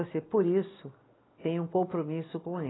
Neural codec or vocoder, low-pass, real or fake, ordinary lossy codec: none; 7.2 kHz; real; AAC, 16 kbps